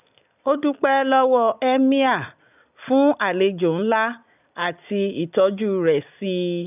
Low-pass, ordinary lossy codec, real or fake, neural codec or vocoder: 3.6 kHz; none; real; none